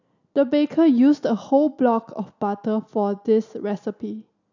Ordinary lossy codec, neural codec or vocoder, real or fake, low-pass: none; none; real; 7.2 kHz